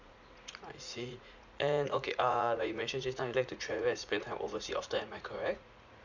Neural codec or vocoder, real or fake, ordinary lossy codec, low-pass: vocoder, 44.1 kHz, 80 mel bands, Vocos; fake; none; 7.2 kHz